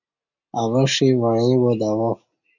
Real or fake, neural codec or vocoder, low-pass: real; none; 7.2 kHz